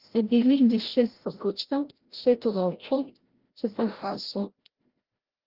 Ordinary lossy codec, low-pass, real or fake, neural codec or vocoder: Opus, 16 kbps; 5.4 kHz; fake; codec, 16 kHz, 0.5 kbps, FreqCodec, larger model